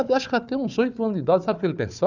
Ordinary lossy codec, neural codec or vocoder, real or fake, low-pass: none; codec, 16 kHz, 4 kbps, FunCodec, trained on Chinese and English, 50 frames a second; fake; 7.2 kHz